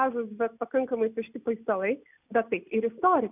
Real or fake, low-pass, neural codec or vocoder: real; 3.6 kHz; none